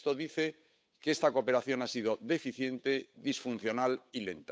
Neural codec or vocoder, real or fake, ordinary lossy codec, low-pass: codec, 16 kHz, 8 kbps, FunCodec, trained on Chinese and English, 25 frames a second; fake; none; none